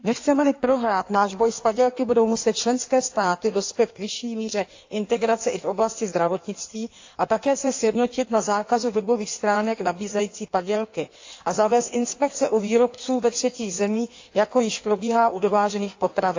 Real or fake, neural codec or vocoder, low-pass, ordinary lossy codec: fake; codec, 16 kHz in and 24 kHz out, 1.1 kbps, FireRedTTS-2 codec; 7.2 kHz; AAC, 48 kbps